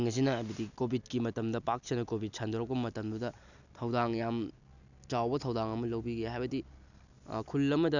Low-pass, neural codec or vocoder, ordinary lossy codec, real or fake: 7.2 kHz; none; none; real